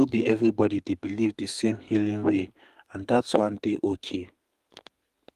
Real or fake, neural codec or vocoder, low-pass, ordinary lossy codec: fake; codec, 32 kHz, 1.9 kbps, SNAC; 14.4 kHz; Opus, 32 kbps